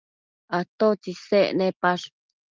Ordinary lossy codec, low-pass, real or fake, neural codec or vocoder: Opus, 32 kbps; 7.2 kHz; real; none